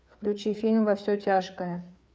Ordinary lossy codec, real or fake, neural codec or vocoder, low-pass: none; fake; codec, 16 kHz, 4 kbps, FreqCodec, larger model; none